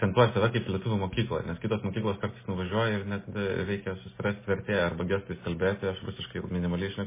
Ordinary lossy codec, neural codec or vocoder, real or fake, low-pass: MP3, 16 kbps; none; real; 3.6 kHz